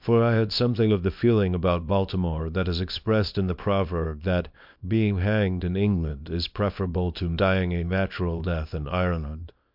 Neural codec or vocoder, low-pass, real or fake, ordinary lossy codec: codec, 24 kHz, 0.9 kbps, WavTokenizer, small release; 5.4 kHz; fake; MP3, 48 kbps